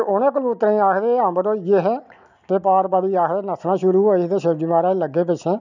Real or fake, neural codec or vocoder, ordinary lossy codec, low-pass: real; none; none; 7.2 kHz